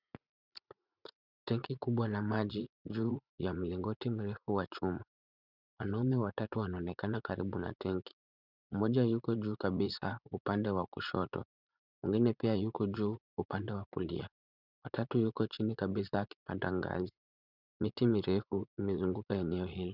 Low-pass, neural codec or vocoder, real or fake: 5.4 kHz; vocoder, 44.1 kHz, 80 mel bands, Vocos; fake